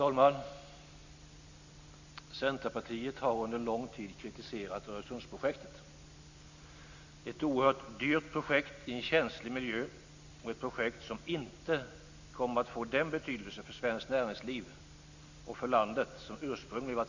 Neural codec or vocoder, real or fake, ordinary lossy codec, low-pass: none; real; Opus, 64 kbps; 7.2 kHz